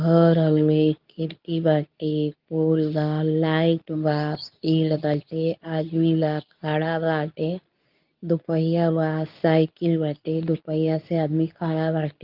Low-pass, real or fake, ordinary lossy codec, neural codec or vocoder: 5.4 kHz; fake; Opus, 24 kbps; codec, 24 kHz, 0.9 kbps, WavTokenizer, medium speech release version 2